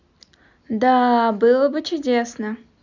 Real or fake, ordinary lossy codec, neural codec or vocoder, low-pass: real; none; none; 7.2 kHz